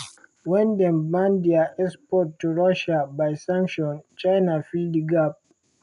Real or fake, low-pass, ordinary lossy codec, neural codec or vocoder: real; 10.8 kHz; none; none